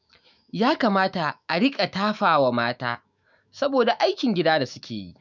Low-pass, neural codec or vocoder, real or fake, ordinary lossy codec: 7.2 kHz; autoencoder, 48 kHz, 128 numbers a frame, DAC-VAE, trained on Japanese speech; fake; none